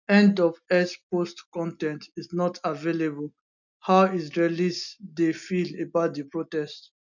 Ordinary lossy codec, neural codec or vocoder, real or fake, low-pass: none; none; real; 7.2 kHz